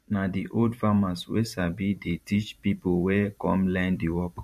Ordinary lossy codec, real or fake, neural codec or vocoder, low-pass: MP3, 64 kbps; fake; vocoder, 44.1 kHz, 128 mel bands every 512 samples, BigVGAN v2; 14.4 kHz